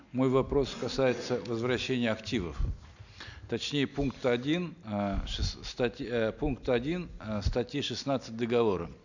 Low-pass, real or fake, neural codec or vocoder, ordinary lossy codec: 7.2 kHz; real; none; none